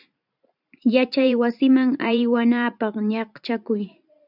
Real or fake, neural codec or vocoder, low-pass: fake; vocoder, 44.1 kHz, 128 mel bands every 512 samples, BigVGAN v2; 5.4 kHz